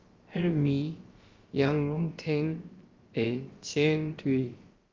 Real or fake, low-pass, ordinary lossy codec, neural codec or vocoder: fake; 7.2 kHz; Opus, 32 kbps; codec, 16 kHz, about 1 kbps, DyCAST, with the encoder's durations